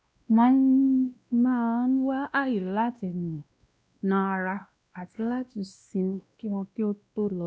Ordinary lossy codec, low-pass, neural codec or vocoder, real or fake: none; none; codec, 16 kHz, 1 kbps, X-Codec, WavLM features, trained on Multilingual LibriSpeech; fake